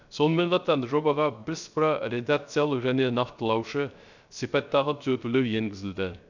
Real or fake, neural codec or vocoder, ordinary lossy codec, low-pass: fake; codec, 16 kHz, 0.3 kbps, FocalCodec; none; 7.2 kHz